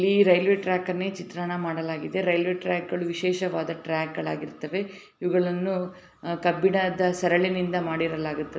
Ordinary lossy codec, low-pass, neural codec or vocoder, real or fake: none; none; none; real